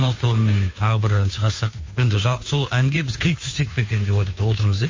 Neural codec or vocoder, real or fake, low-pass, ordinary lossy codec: codec, 16 kHz, 2 kbps, FunCodec, trained on Chinese and English, 25 frames a second; fake; 7.2 kHz; MP3, 32 kbps